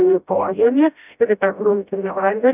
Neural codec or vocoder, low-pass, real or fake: codec, 16 kHz, 0.5 kbps, FreqCodec, smaller model; 3.6 kHz; fake